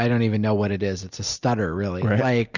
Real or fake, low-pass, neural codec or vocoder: real; 7.2 kHz; none